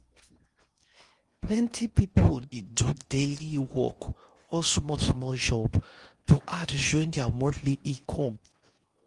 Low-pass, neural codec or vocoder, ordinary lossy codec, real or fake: 10.8 kHz; codec, 16 kHz in and 24 kHz out, 0.8 kbps, FocalCodec, streaming, 65536 codes; Opus, 32 kbps; fake